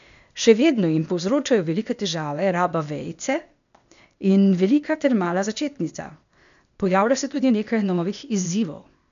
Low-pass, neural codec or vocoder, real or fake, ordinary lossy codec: 7.2 kHz; codec, 16 kHz, 0.8 kbps, ZipCodec; fake; none